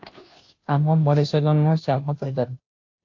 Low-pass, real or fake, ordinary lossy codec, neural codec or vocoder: 7.2 kHz; fake; AAC, 48 kbps; codec, 16 kHz, 0.5 kbps, FunCodec, trained on Chinese and English, 25 frames a second